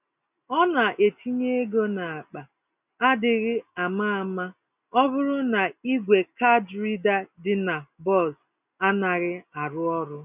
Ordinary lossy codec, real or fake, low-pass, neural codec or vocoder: none; real; 3.6 kHz; none